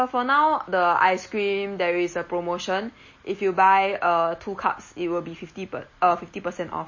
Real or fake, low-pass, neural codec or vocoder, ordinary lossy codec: real; 7.2 kHz; none; MP3, 32 kbps